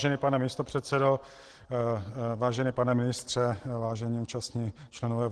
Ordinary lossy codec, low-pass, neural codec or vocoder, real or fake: Opus, 16 kbps; 10.8 kHz; none; real